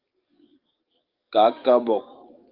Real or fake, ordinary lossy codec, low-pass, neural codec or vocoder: fake; Opus, 32 kbps; 5.4 kHz; codec, 44.1 kHz, 7.8 kbps, DAC